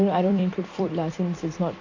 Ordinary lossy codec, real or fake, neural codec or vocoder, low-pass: AAC, 48 kbps; fake; vocoder, 44.1 kHz, 128 mel bands every 256 samples, BigVGAN v2; 7.2 kHz